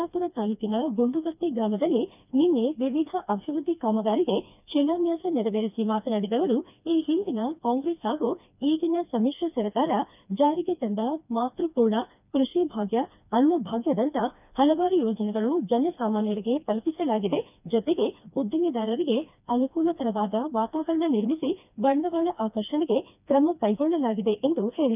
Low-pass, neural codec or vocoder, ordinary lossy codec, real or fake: 3.6 kHz; codec, 16 kHz, 2 kbps, FreqCodec, smaller model; none; fake